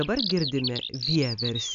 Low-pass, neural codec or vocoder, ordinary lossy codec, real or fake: 7.2 kHz; none; MP3, 96 kbps; real